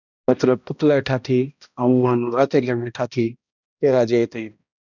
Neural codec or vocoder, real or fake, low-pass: codec, 16 kHz, 1 kbps, X-Codec, HuBERT features, trained on balanced general audio; fake; 7.2 kHz